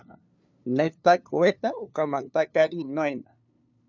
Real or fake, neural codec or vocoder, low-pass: fake; codec, 16 kHz, 2 kbps, FunCodec, trained on LibriTTS, 25 frames a second; 7.2 kHz